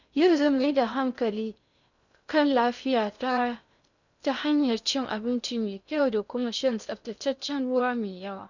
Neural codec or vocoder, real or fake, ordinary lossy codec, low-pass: codec, 16 kHz in and 24 kHz out, 0.6 kbps, FocalCodec, streaming, 2048 codes; fake; none; 7.2 kHz